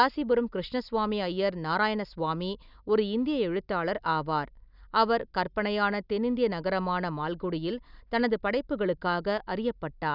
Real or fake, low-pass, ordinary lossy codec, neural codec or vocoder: real; 5.4 kHz; none; none